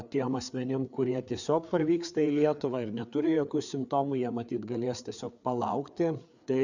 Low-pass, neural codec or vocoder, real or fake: 7.2 kHz; codec, 16 kHz, 4 kbps, FreqCodec, larger model; fake